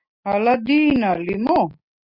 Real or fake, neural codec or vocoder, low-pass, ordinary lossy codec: real; none; 5.4 kHz; Opus, 64 kbps